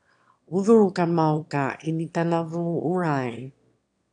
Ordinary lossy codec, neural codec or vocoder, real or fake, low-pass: AAC, 64 kbps; autoencoder, 22.05 kHz, a latent of 192 numbers a frame, VITS, trained on one speaker; fake; 9.9 kHz